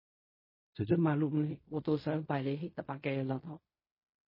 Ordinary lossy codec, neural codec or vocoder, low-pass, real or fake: MP3, 24 kbps; codec, 16 kHz in and 24 kHz out, 0.4 kbps, LongCat-Audio-Codec, fine tuned four codebook decoder; 5.4 kHz; fake